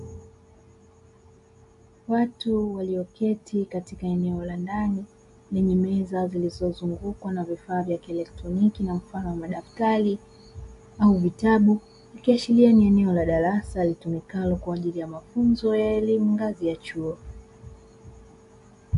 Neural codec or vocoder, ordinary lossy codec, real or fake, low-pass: none; AAC, 64 kbps; real; 10.8 kHz